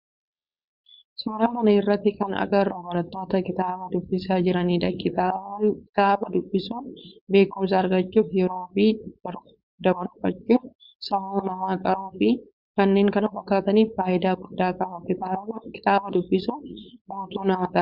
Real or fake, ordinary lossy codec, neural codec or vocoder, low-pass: fake; Opus, 64 kbps; codec, 16 kHz, 4.8 kbps, FACodec; 5.4 kHz